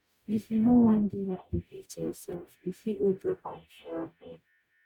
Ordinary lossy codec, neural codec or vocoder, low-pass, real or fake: none; codec, 44.1 kHz, 0.9 kbps, DAC; 19.8 kHz; fake